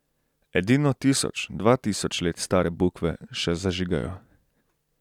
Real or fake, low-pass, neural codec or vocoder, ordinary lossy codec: real; 19.8 kHz; none; none